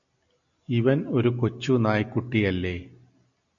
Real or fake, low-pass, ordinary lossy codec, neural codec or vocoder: real; 7.2 kHz; MP3, 64 kbps; none